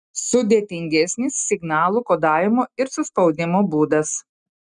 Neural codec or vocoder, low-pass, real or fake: none; 10.8 kHz; real